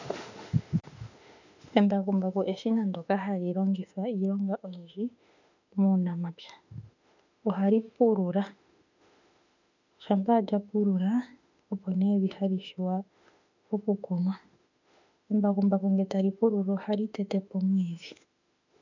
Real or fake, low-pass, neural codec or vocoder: fake; 7.2 kHz; autoencoder, 48 kHz, 32 numbers a frame, DAC-VAE, trained on Japanese speech